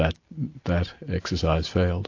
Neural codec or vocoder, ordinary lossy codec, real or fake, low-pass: none; AAC, 48 kbps; real; 7.2 kHz